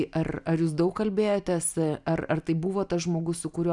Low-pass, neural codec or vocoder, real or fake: 10.8 kHz; none; real